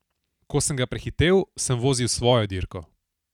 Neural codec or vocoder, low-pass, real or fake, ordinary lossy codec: none; 19.8 kHz; real; none